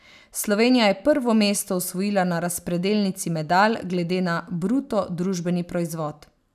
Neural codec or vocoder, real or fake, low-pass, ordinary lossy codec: none; real; 14.4 kHz; none